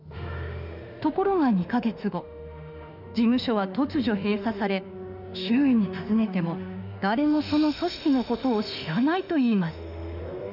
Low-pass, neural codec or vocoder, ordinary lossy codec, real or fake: 5.4 kHz; autoencoder, 48 kHz, 32 numbers a frame, DAC-VAE, trained on Japanese speech; none; fake